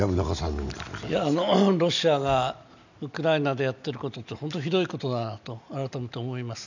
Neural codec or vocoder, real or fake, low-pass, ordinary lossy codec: none; real; 7.2 kHz; none